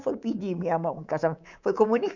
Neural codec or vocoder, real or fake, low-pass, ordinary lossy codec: codec, 24 kHz, 3.1 kbps, DualCodec; fake; 7.2 kHz; none